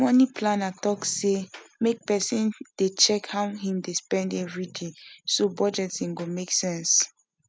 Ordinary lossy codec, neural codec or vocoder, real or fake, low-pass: none; none; real; none